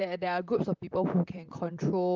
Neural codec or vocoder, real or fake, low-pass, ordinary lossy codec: vocoder, 44.1 kHz, 128 mel bands, Pupu-Vocoder; fake; 7.2 kHz; Opus, 24 kbps